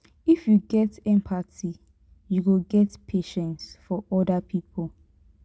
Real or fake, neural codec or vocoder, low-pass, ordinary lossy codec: real; none; none; none